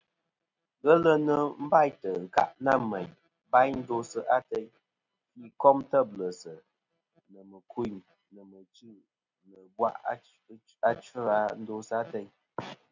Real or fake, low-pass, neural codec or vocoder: real; 7.2 kHz; none